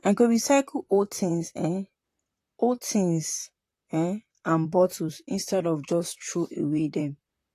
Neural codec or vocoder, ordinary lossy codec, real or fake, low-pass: vocoder, 44.1 kHz, 128 mel bands, Pupu-Vocoder; AAC, 48 kbps; fake; 14.4 kHz